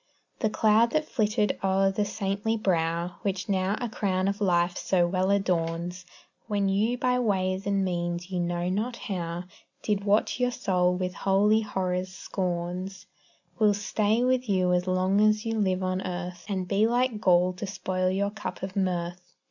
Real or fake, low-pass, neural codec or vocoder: real; 7.2 kHz; none